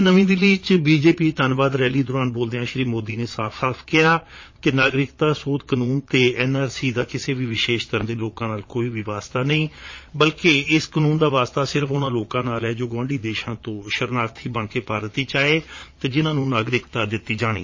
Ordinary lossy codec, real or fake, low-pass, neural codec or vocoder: MP3, 32 kbps; fake; 7.2 kHz; vocoder, 22.05 kHz, 80 mel bands, Vocos